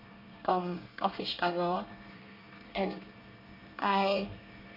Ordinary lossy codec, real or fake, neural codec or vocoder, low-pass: none; fake; codec, 24 kHz, 1 kbps, SNAC; 5.4 kHz